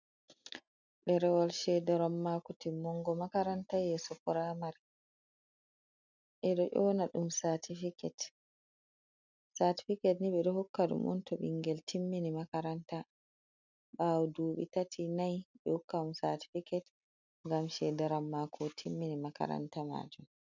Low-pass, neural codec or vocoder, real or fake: 7.2 kHz; none; real